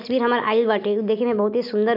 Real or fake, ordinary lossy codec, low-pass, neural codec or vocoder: real; none; 5.4 kHz; none